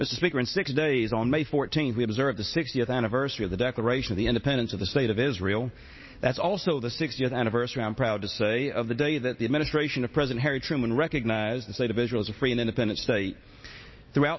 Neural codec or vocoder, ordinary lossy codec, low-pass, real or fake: none; MP3, 24 kbps; 7.2 kHz; real